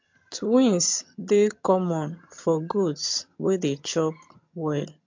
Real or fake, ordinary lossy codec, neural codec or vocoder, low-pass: fake; MP3, 48 kbps; vocoder, 22.05 kHz, 80 mel bands, HiFi-GAN; 7.2 kHz